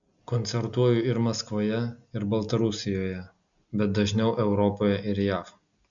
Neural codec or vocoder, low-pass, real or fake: none; 7.2 kHz; real